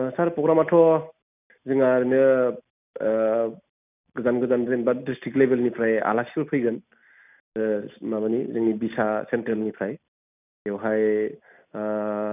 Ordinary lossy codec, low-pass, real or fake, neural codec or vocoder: none; 3.6 kHz; real; none